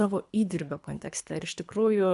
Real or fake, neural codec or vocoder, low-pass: fake; codec, 24 kHz, 3 kbps, HILCodec; 10.8 kHz